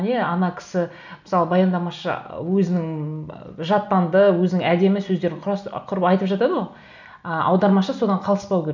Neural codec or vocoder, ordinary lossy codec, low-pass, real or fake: none; none; 7.2 kHz; real